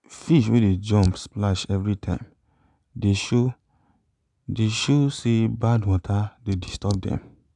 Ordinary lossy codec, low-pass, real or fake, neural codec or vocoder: none; 10.8 kHz; real; none